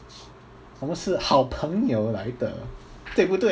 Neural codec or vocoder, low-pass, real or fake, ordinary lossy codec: none; none; real; none